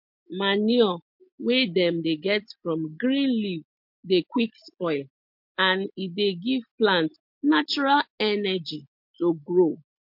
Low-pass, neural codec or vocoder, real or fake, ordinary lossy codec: 5.4 kHz; none; real; MP3, 48 kbps